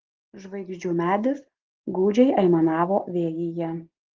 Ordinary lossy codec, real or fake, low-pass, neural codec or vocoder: Opus, 16 kbps; real; 7.2 kHz; none